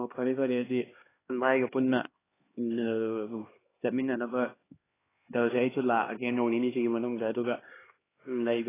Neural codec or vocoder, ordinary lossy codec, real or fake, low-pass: codec, 16 kHz, 2 kbps, X-Codec, HuBERT features, trained on LibriSpeech; AAC, 16 kbps; fake; 3.6 kHz